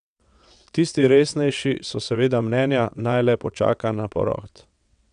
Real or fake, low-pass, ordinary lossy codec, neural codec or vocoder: fake; 9.9 kHz; none; vocoder, 22.05 kHz, 80 mel bands, WaveNeXt